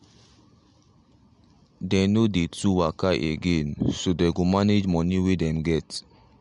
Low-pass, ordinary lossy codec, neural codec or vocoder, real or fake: 10.8 kHz; MP3, 64 kbps; none; real